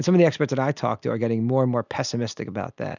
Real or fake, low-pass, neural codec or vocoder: real; 7.2 kHz; none